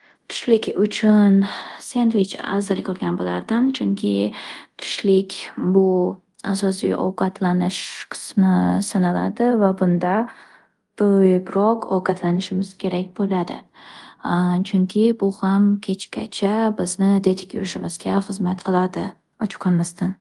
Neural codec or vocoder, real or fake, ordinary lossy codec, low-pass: codec, 24 kHz, 0.5 kbps, DualCodec; fake; Opus, 24 kbps; 10.8 kHz